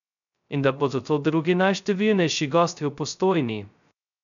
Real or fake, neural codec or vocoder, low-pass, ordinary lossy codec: fake; codec, 16 kHz, 0.2 kbps, FocalCodec; 7.2 kHz; none